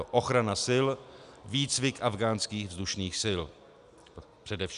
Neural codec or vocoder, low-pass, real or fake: none; 10.8 kHz; real